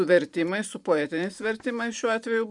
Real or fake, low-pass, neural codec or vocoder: fake; 10.8 kHz; vocoder, 44.1 kHz, 128 mel bands every 512 samples, BigVGAN v2